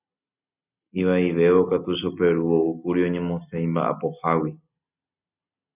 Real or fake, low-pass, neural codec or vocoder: real; 3.6 kHz; none